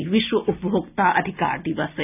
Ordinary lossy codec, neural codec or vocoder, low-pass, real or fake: none; none; 3.6 kHz; real